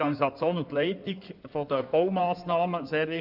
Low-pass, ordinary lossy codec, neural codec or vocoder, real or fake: 5.4 kHz; none; codec, 16 kHz in and 24 kHz out, 2.2 kbps, FireRedTTS-2 codec; fake